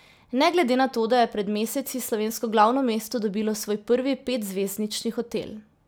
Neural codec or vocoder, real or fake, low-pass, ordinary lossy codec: none; real; none; none